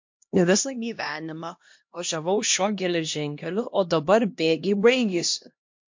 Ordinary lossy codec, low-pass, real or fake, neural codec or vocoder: MP3, 48 kbps; 7.2 kHz; fake; codec, 16 kHz, 1 kbps, X-Codec, HuBERT features, trained on LibriSpeech